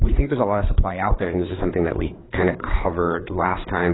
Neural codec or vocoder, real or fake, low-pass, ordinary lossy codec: codec, 16 kHz, 4 kbps, FreqCodec, larger model; fake; 7.2 kHz; AAC, 16 kbps